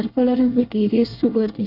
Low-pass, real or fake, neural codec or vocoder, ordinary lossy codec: 5.4 kHz; fake; codec, 24 kHz, 1 kbps, SNAC; none